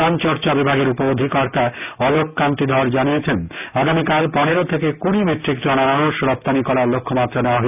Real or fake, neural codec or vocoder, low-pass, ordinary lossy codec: real; none; 3.6 kHz; none